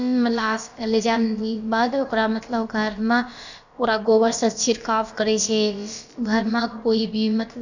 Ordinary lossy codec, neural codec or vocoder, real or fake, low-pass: none; codec, 16 kHz, about 1 kbps, DyCAST, with the encoder's durations; fake; 7.2 kHz